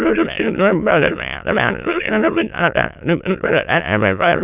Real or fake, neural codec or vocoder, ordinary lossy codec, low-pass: fake; autoencoder, 22.05 kHz, a latent of 192 numbers a frame, VITS, trained on many speakers; none; 3.6 kHz